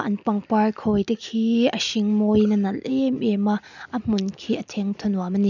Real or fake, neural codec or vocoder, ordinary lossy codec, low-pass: real; none; none; 7.2 kHz